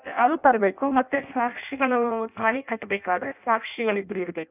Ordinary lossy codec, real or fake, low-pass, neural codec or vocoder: none; fake; 3.6 kHz; codec, 16 kHz in and 24 kHz out, 0.6 kbps, FireRedTTS-2 codec